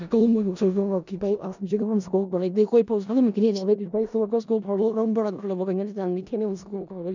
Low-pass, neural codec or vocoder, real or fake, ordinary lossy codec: 7.2 kHz; codec, 16 kHz in and 24 kHz out, 0.4 kbps, LongCat-Audio-Codec, four codebook decoder; fake; none